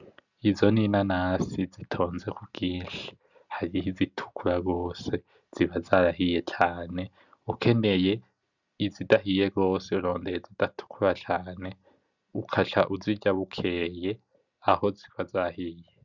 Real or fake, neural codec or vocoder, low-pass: real; none; 7.2 kHz